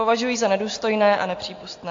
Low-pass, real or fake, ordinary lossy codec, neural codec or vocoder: 7.2 kHz; real; AAC, 48 kbps; none